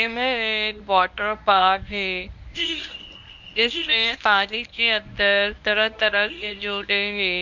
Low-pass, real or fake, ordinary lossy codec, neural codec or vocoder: 7.2 kHz; fake; none; codec, 24 kHz, 0.9 kbps, WavTokenizer, medium speech release version 1